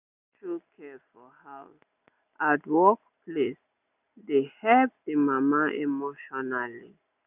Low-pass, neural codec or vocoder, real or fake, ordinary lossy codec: 3.6 kHz; none; real; none